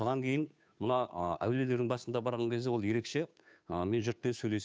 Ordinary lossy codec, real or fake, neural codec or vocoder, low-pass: none; fake; codec, 16 kHz, 2 kbps, FunCodec, trained on Chinese and English, 25 frames a second; none